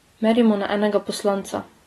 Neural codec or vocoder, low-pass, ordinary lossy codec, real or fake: none; 19.8 kHz; AAC, 32 kbps; real